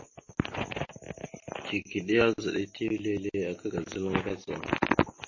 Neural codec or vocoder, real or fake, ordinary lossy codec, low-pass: none; real; MP3, 32 kbps; 7.2 kHz